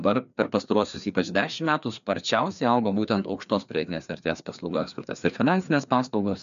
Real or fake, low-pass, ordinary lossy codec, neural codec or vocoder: fake; 7.2 kHz; MP3, 96 kbps; codec, 16 kHz, 2 kbps, FreqCodec, larger model